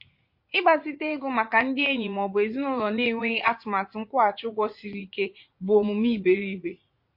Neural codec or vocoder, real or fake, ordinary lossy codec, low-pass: vocoder, 44.1 kHz, 80 mel bands, Vocos; fake; MP3, 32 kbps; 5.4 kHz